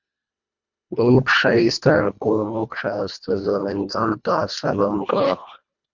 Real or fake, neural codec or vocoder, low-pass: fake; codec, 24 kHz, 1.5 kbps, HILCodec; 7.2 kHz